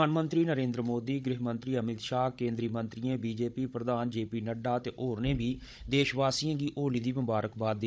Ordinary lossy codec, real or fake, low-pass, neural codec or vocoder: none; fake; none; codec, 16 kHz, 16 kbps, FunCodec, trained on Chinese and English, 50 frames a second